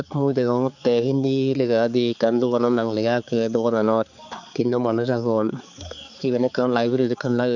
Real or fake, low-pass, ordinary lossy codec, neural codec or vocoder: fake; 7.2 kHz; none; codec, 16 kHz, 4 kbps, X-Codec, HuBERT features, trained on balanced general audio